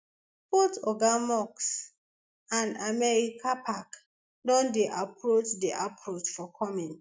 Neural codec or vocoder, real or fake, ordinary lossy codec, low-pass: none; real; none; none